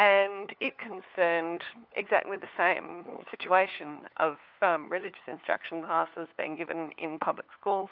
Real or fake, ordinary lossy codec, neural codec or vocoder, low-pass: fake; AAC, 48 kbps; codec, 16 kHz, 4 kbps, FunCodec, trained on LibriTTS, 50 frames a second; 5.4 kHz